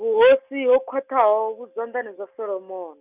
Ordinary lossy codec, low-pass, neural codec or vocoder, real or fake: none; 3.6 kHz; none; real